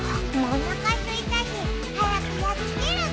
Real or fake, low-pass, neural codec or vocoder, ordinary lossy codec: real; none; none; none